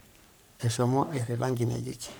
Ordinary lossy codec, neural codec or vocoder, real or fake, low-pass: none; codec, 44.1 kHz, 3.4 kbps, Pupu-Codec; fake; none